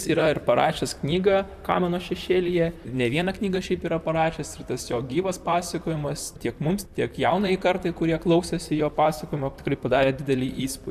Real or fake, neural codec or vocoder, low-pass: fake; vocoder, 44.1 kHz, 128 mel bands, Pupu-Vocoder; 14.4 kHz